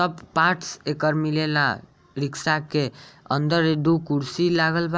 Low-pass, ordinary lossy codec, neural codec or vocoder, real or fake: none; none; none; real